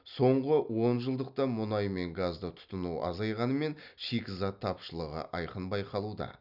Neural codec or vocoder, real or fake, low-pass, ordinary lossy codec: none; real; 5.4 kHz; none